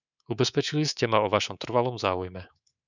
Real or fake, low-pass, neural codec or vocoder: fake; 7.2 kHz; codec, 24 kHz, 3.1 kbps, DualCodec